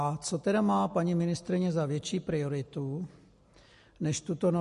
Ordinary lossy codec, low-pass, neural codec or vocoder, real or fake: MP3, 48 kbps; 14.4 kHz; none; real